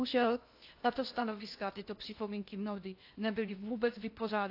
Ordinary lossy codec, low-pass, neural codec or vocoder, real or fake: AAC, 32 kbps; 5.4 kHz; codec, 16 kHz in and 24 kHz out, 0.8 kbps, FocalCodec, streaming, 65536 codes; fake